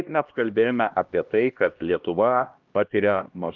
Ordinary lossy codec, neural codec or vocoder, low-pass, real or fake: Opus, 24 kbps; codec, 16 kHz, 1 kbps, X-Codec, HuBERT features, trained on LibriSpeech; 7.2 kHz; fake